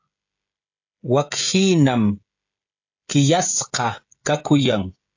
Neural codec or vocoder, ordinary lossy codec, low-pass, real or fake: codec, 16 kHz, 16 kbps, FreqCodec, smaller model; AAC, 48 kbps; 7.2 kHz; fake